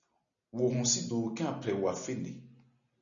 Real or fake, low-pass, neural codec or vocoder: real; 7.2 kHz; none